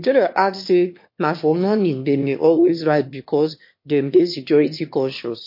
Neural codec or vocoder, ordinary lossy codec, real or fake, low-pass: autoencoder, 22.05 kHz, a latent of 192 numbers a frame, VITS, trained on one speaker; MP3, 32 kbps; fake; 5.4 kHz